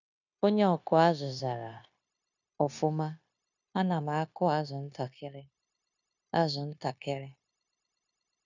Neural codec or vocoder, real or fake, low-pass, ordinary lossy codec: codec, 16 kHz, 0.9 kbps, LongCat-Audio-Codec; fake; 7.2 kHz; none